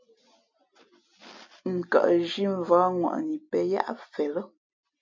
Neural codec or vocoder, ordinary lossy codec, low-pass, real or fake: none; MP3, 64 kbps; 7.2 kHz; real